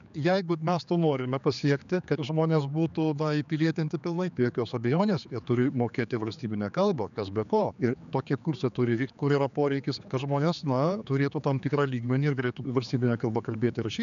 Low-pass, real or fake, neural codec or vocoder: 7.2 kHz; fake; codec, 16 kHz, 4 kbps, X-Codec, HuBERT features, trained on general audio